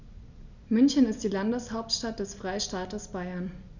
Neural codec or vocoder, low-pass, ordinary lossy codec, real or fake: none; 7.2 kHz; none; real